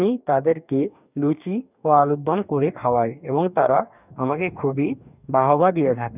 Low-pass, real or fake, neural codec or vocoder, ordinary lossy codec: 3.6 kHz; fake; codec, 44.1 kHz, 2.6 kbps, DAC; none